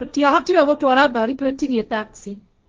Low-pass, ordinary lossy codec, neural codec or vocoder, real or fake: 7.2 kHz; Opus, 32 kbps; codec, 16 kHz, 1.1 kbps, Voila-Tokenizer; fake